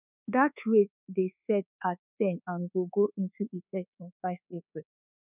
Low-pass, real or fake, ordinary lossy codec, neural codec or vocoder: 3.6 kHz; fake; none; codec, 24 kHz, 1.2 kbps, DualCodec